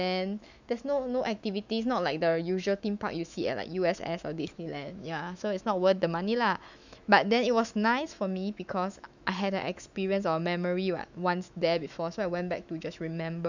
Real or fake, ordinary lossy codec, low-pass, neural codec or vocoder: fake; none; 7.2 kHz; autoencoder, 48 kHz, 128 numbers a frame, DAC-VAE, trained on Japanese speech